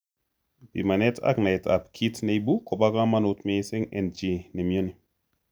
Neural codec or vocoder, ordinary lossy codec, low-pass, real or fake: none; none; none; real